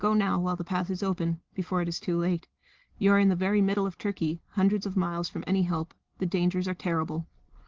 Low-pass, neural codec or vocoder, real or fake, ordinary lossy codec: 7.2 kHz; none; real; Opus, 16 kbps